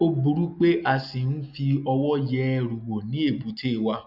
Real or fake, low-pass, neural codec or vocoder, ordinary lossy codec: real; 5.4 kHz; none; none